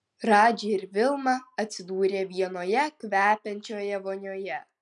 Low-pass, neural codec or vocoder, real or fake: 10.8 kHz; none; real